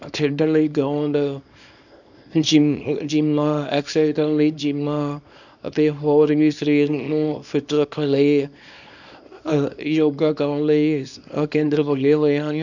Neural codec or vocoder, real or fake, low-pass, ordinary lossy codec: codec, 24 kHz, 0.9 kbps, WavTokenizer, small release; fake; 7.2 kHz; none